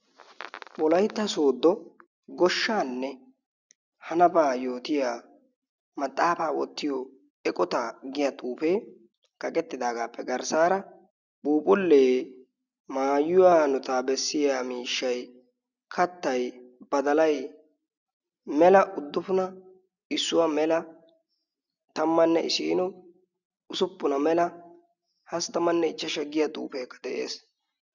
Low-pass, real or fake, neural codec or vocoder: 7.2 kHz; real; none